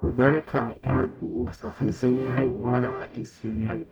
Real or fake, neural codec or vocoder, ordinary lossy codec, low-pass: fake; codec, 44.1 kHz, 0.9 kbps, DAC; none; 19.8 kHz